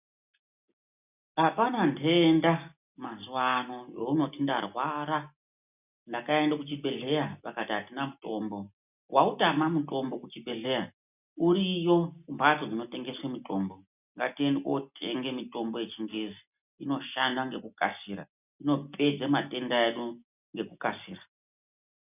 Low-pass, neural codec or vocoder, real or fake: 3.6 kHz; none; real